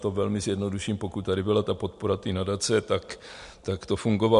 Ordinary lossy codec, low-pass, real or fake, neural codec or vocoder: MP3, 64 kbps; 10.8 kHz; real; none